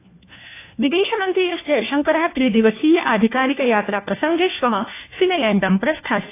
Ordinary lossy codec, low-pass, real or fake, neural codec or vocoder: AAC, 24 kbps; 3.6 kHz; fake; codec, 16 kHz, 1 kbps, FunCodec, trained on LibriTTS, 50 frames a second